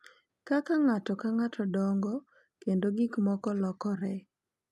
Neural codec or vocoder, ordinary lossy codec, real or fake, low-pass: none; none; real; none